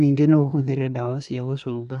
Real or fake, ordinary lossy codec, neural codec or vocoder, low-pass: fake; none; codec, 24 kHz, 1 kbps, SNAC; 10.8 kHz